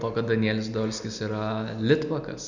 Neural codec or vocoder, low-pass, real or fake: none; 7.2 kHz; real